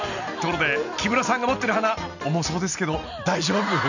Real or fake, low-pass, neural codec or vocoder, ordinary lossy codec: real; 7.2 kHz; none; none